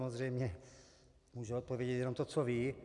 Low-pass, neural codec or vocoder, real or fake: 10.8 kHz; none; real